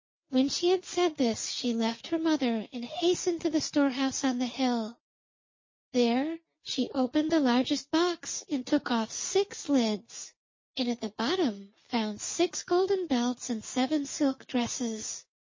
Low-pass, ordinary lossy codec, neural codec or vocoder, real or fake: 7.2 kHz; MP3, 32 kbps; none; real